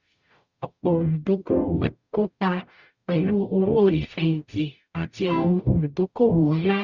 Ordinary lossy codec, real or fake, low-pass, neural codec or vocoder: Opus, 64 kbps; fake; 7.2 kHz; codec, 44.1 kHz, 0.9 kbps, DAC